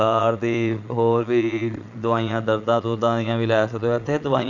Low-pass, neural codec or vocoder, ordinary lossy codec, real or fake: 7.2 kHz; vocoder, 22.05 kHz, 80 mel bands, Vocos; none; fake